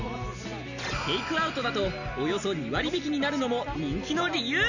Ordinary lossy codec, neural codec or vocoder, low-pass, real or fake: none; none; 7.2 kHz; real